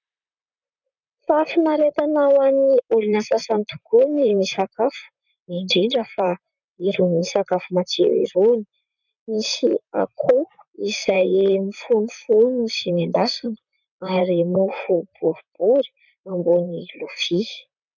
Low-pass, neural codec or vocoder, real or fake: 7.2 kHz; vocoder, 44.1 kHz, 128 mel bands, Pupu-Vocoder; fake